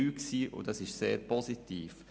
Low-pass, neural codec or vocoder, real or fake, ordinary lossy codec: none; none; real; none